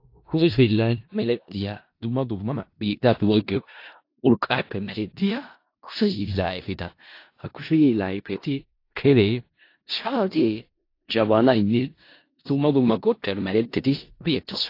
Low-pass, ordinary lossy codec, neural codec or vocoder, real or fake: 5.4 kHz; AAC, 32 kbps; codec, 16 kHz in and 24 kHz out, 0.4 kbps, LongCat-Audio-Codec, four codebook decoder; fake